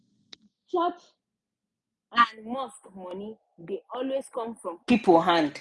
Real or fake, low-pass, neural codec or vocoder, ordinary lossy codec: real; none; none; none